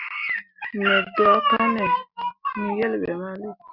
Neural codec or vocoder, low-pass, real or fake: none; 5.4 kHz; real